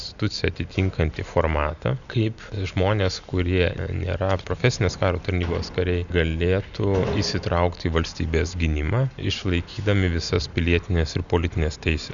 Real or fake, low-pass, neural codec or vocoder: real; 7.2 kHz; none